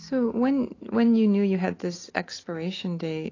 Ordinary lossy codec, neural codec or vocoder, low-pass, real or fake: AAC, 32 kbps; none; 7.2 kHz; real